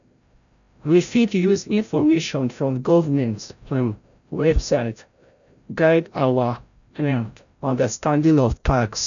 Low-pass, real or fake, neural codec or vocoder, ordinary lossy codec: 7.2 kHz; fake; codec, 16 kHz, 0.5 kbps, FreqCodec, larger model; MP3, 96 kbps